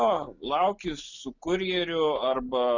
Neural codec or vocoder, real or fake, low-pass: none; real; 7.2 kHz